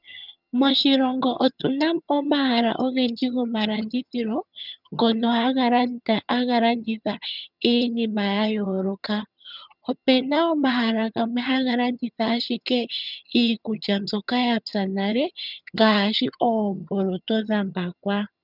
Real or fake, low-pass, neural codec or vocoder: fake; 5.4 kHz; vocoder, 22.05 kHz, 80 mel bands, HiFi-GAN